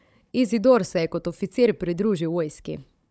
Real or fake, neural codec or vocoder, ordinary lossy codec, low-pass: fake; codec, 16 kHz, 16 kbps, FunCodec, trained on Chinese and English, 50 frames a second; none; none